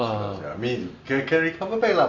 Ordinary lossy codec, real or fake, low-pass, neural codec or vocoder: none; real; 7.2 kHz; none